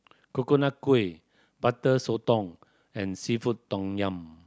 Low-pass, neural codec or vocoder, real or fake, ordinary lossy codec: none; none; real; none